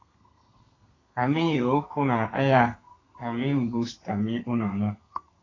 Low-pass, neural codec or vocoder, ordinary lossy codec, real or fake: 7.2 kHz; codec, 32 kHz, 1.9 kbps, SNAC; AAC, 32 kbps; fake